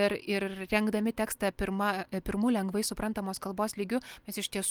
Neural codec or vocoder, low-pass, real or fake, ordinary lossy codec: none; 19.8 kHz; real; Opus, 32 kbps